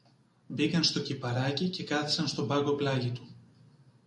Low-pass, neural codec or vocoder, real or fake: 10.8 kHz; none; real